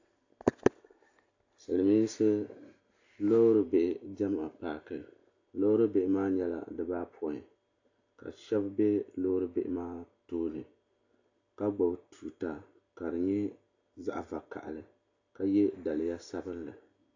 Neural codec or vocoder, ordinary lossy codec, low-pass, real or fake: none; AAC, 48 kbps; 7.2 kHz; real